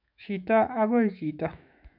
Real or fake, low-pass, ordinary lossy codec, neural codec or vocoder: fake; 5.4 kHz; none; autoencoder, 48 kHz, 128 numbers a frame, DAC-VAE, trained on Japanese speech